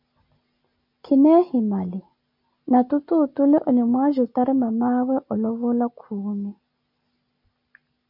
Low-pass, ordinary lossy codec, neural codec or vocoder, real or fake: 5.4 kHz; MP3, 48 kbps; none; real